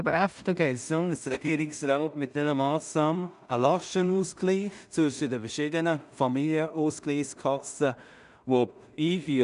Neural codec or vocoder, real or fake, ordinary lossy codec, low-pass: codec, 16 kHz in and 24 kHz out, 0.4 kbps, LongCat-Audio-Codec, two codebook decoder; fake; none; 10.8 kHz